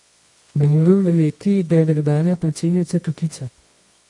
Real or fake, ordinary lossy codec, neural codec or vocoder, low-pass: fake; MP3, 48 kbps; codec, 24 kHz, 0.9 kbps, WavTokenizer, medium music audio release; 10.8 kHz